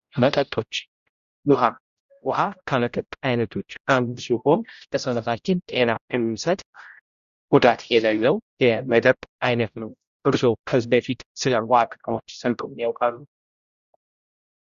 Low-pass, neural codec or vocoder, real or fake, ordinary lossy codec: 7.2 kHz; codec, 16 kHz, 0.5 kbps, X-Codec, HuBERT features, trained on general audio; fake; Opus, 64 kbps